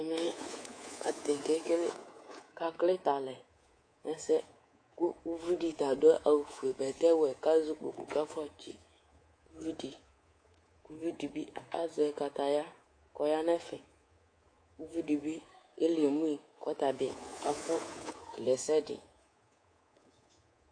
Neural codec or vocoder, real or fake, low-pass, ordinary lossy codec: codec, 24 kHz, 3.1 kbps, DualCodec; fake; 9.9 kHz; AAC, 48 kbps